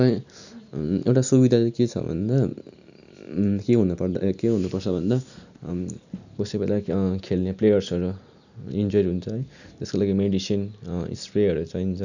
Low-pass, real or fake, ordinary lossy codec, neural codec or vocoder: 7.2 kHz; real; none; none